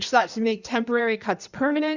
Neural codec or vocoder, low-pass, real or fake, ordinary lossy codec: codec, 16 kHz in and 24 kHz out, 1.1 kbps, FireRedTTS-2 codec; 7.2 kHz; fake; Opus, 64 kbps